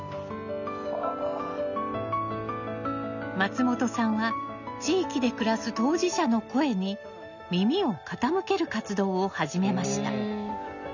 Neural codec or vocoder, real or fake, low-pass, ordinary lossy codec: none; real; 7.2 kHz; none